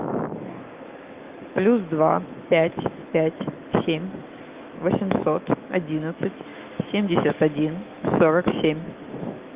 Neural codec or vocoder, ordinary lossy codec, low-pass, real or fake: autoencoder, 48 kHz, 128 numbers a frame, DAC-VAE, trained on Japanese speech; Opus, 32 kbps; 3.6 kHz; fake